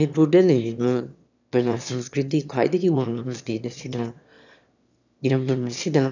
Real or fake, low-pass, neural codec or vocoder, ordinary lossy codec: fake; 7.2 kHz; autoencoder, 22.05 kHz, a latent of 192 numbers a frame, VITS, trained on one speaker; none